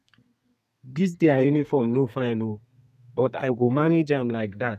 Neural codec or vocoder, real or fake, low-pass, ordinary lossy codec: codec, 32 kHz, 1.9 kbps, SNAC; fake; 14.4 kHz; none